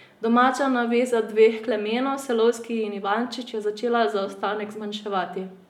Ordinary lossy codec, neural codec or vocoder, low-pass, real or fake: none; none; 19.8 kHz; real